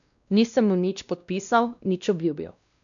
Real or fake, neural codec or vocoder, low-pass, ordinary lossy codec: fake; codec, 16 kHz, 1 kbps, X-Codec, WavLM features, trained on Multilingual LibriSpeech; 7.2 kHz; none